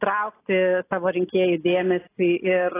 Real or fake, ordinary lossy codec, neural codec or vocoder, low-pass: real; AAC, 16 kbps; none; 3.6 kHz